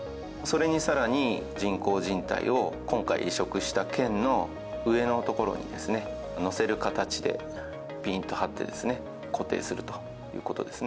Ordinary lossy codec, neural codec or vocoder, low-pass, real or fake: none; none; none; real